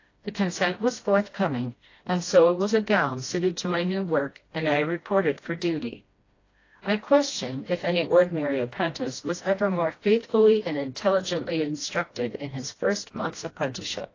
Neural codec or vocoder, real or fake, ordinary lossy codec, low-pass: codec, 16 kHz, 1 kbps, FreqCodec, smaller model; fake; AAC, 32 kbps; 7.2 kHz